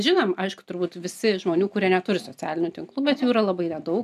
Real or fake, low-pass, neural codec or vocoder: real; 14.4 kHz; none